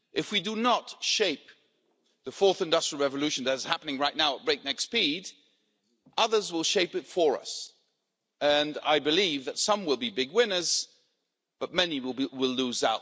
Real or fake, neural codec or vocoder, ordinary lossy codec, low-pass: real; none; none; none